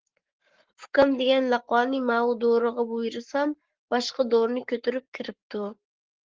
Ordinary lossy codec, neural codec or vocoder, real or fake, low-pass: Opus, 16 kbps; codec, 44.1 kHz, 7.8 kbps, Pupu-Codec; fake; 7.2 kHz